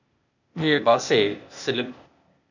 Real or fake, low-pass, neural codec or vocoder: fake; 7.2 kHz; codec, 16 kHz, 0.8 kbps, ZipCodec